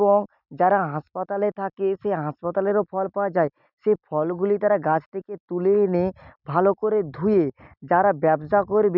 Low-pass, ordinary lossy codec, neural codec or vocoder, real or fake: 5.4 kHz; none; none; real